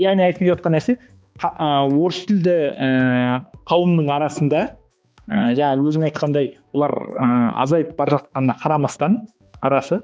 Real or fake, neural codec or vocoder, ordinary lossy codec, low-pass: fake; codec, 16 kHz, 2 kbps, X-Codec, HuBERT features, trained on balanced general audio; none; none